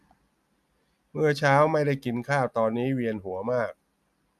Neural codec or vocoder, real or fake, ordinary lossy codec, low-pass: none; real; none; 14.4 kHz